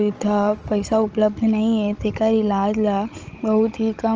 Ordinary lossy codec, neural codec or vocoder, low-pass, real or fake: Opus, 24 kbps; codec, 16 kHz, 16 kbps, FunCodec, trained on Chinese and English, 50 frames a second; 7.2 kHz; fake